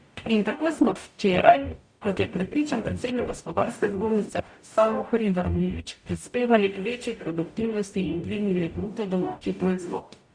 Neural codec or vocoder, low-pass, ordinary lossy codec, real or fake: codec, 44.1 kHz, 0.9 kbps, DAC; 9.9 kHz; Opus, 64 kbps; fake